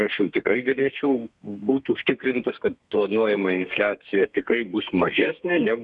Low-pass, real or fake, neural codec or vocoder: 10.8 kHz; fake; codec, 32 kHz, 1.9 kbps, SNAC